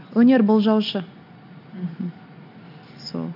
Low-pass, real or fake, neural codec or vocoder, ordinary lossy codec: 5.4 kHz; real; none; none